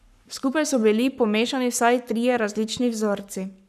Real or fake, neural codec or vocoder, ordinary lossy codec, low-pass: fake; codec, 44.1 kHz, 7.8 kbps, Pupu-Codec; none; 14.4 kHz